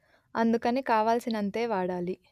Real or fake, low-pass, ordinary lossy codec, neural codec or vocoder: real; 14.4 kHz; none; none